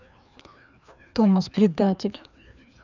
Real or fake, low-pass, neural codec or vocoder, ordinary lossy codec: fake; 7.2 kHz; codec, 16 kHz, 2 kbps, FreqCodec, larger model; none